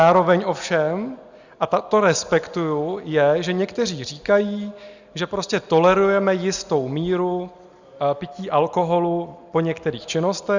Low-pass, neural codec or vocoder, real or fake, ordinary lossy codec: 7.2 kHz; none; real; Opus, 64 kbps